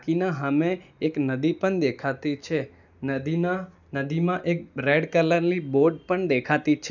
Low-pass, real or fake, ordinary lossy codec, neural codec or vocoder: 7.2 kHz; real; none; none